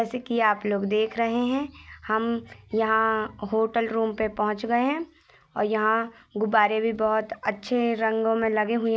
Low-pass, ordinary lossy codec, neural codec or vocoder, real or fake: none; none; none; real